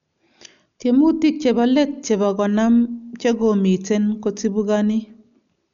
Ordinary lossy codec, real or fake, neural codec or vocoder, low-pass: none; real; none; 7.2 kHz